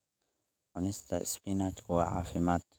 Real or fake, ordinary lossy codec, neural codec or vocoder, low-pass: fake; none; codec, 44.1 kHz, 7.8 kbps, DAC; none